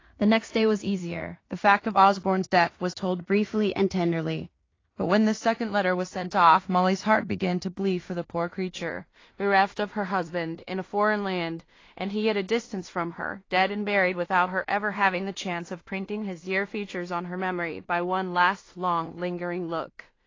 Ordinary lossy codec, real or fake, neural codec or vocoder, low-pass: AAC, 32 kbps; fake; codec, 16 kHz in and 24 kHz out, 0.4 kbps, LongCat-Audio-Codec, two codebook decoder; 7.2 kHz